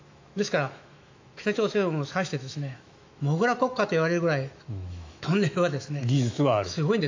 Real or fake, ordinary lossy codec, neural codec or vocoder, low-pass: fake; AAC, 48 kbps; autoencoder, 48 kHz, 128 numbers a frame, DAC-VAE, trained on Japanese speech; 7.2 kHz